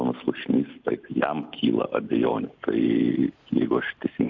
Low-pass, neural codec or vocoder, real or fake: 7.2 kHz; none; real